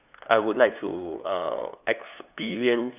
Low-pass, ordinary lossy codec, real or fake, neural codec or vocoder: 3.6 kHz; none; fake; codec, 16 kHz, 2 kbps, FunCodec, trained on LibriTTS, 25 frames a second